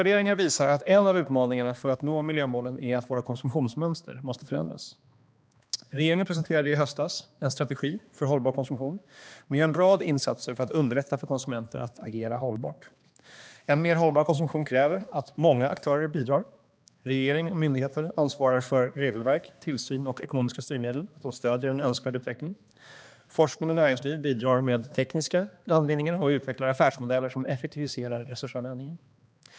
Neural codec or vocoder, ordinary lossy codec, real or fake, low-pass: codec, 16 kHz, 2 kbps, X-Codec, HuBERT features, trained on balanced general audio; none; fake; none